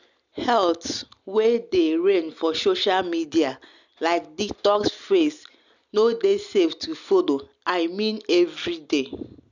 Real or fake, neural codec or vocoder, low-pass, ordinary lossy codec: real; none; 7.2 kHz; none